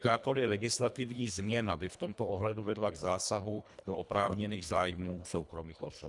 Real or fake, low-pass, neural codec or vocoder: fake; 10.8 kHz; codec, 24 kHz, 1.5 kbps, HILCodec